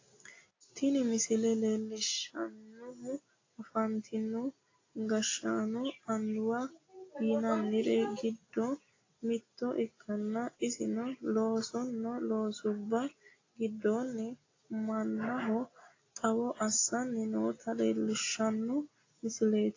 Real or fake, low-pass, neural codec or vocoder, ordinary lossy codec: real; 7.2 kHz; none; AAC, 32 kbps